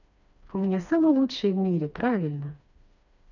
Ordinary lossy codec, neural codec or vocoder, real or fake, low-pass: none; codec, 16 kHz, 2 kbps, FreqCodec, smaller model; fake; 7.2 kHz